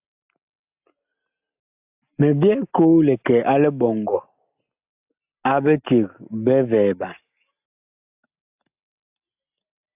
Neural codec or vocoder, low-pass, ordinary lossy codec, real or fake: none; 3.6 kHz; AAC, 32 kbps; real